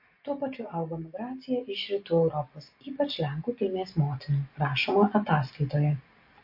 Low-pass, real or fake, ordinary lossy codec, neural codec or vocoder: 5.4 kHz; real; MP3, 32 kbps; none